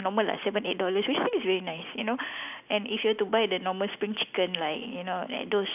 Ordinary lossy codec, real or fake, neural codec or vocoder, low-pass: none; real; none; 3.6 kHz